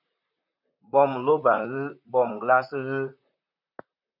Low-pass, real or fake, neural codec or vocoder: 5.4 kHz; fake; vocoder, 44.1 kHz, 128 mel bands, Pupu-Vocoder